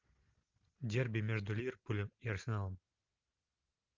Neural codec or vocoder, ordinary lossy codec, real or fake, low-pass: none; Opus, 24 kbps; real; 7.2 kHz